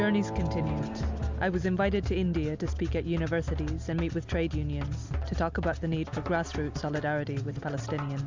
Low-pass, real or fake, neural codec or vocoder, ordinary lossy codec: 7.2 kHz; real; none; MP3, 64 kbps